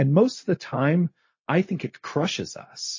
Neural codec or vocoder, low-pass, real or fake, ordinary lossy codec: codec, 16 kHz, 0.4 kbps, LongCat-Audio-Codec; 7.2 kHz; fake; MP3, 32 kbps